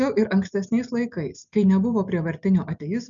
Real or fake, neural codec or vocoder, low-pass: real; none; 7.2 kHz